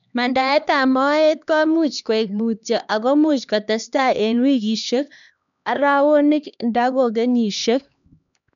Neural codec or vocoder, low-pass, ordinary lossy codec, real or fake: codec, 16 kHz, 2 kbps, X-Codec, HuBERT features, trained on LibriSpeech; 7.2 kHz; none; fake